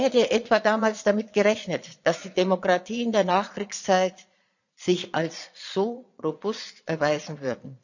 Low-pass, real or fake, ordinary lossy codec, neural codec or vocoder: 7.2 kHz; fake; none; vocoder, 22.05 kHz, 80 mel bands, Vocos